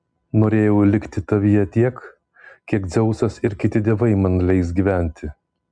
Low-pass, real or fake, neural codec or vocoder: 9.9 kHz; real; none